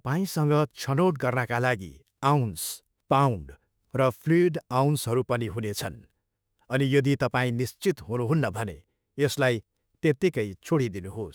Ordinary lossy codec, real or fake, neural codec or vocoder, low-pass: none; fake; autoencoder, 48 kHz, 32 numbers a frame, DAC-VAE, trained on Japanese speech; none